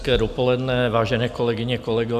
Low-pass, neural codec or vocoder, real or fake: 14.4 kHz; none; real